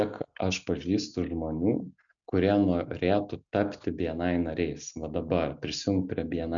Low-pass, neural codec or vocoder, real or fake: 7.2 kHz; none; real